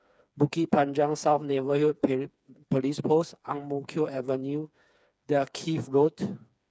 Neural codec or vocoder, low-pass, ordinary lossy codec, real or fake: codec, 16 kHz, 4 kbps, FreqCodec, smaller model; none; none; fake